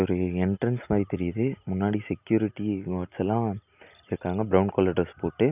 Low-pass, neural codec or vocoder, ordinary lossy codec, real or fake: 3.6 kHz; none; none; real